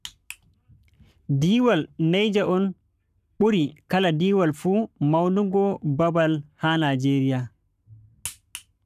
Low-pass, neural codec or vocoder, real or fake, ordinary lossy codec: 14.4 kHz; codec, 44.1 kHz, 7.8 kbps, Pupu-Codec; fake; none